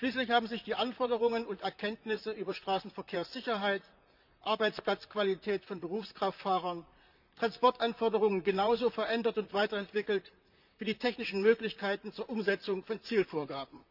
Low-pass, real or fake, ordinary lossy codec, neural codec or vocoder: 5.4 kHz; fake; none; vocoder, 44.1 kHz, 128 mel bands, Pupu-Vocoder